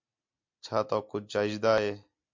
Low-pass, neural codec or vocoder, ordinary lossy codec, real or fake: 7.2 kHz; none; MP3, 64 kbps; real